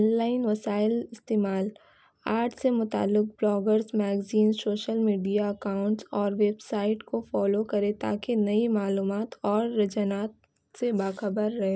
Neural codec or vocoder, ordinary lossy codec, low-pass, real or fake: none; none; none; real